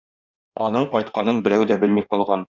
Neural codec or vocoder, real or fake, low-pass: codec, 16 kHz in and 24 kHz out, 1.1 kbps, FireRedTTS-2 codec; fake; 7.2 kHz